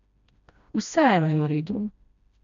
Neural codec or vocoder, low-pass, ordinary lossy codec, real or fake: codec, 16 kHz, 1 kbps, FreqCodec, smaller model; 7.2 kHz; none; fake